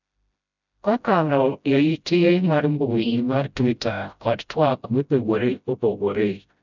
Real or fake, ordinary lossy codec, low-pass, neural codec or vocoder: fake; none; 7.2 kHz; codec, 16 kHz, 0.5 kbps, FreqCodec, smaller model